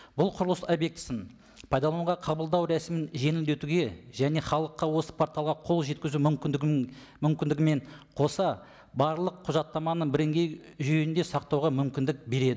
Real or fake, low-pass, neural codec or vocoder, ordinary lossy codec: real; none; none; none